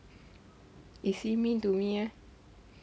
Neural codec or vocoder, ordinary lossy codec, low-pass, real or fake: none; none; none; real